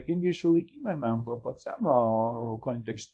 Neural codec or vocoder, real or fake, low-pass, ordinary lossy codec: codec, 24 kHz, 0.9 kbps, WavTokenizer, small release; fake; 10.8 kHz; MP3, 64 kbps